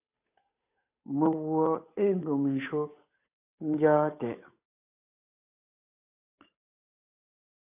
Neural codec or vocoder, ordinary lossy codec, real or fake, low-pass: codec, 16 kHz, 8 kbps, FunCodec, trained on Chinese and English, 25 frames a second; AAC, 32 kbps; fake; 3.6 kHz